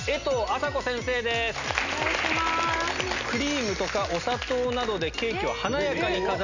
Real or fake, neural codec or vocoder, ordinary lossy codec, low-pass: real; none; none; 7.2 kHz